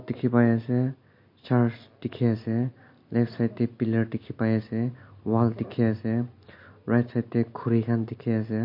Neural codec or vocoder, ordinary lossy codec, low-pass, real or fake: none; MP3, 32 kbps; 5.4 kHz; real